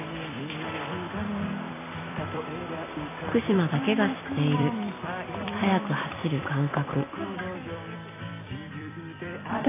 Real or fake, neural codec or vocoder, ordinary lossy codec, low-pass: fake; vocoder, 44.1 kHz, 128 mel bands every 256 samples, BigVGAN v2; AAC, 16 kbps; 3.6 kHz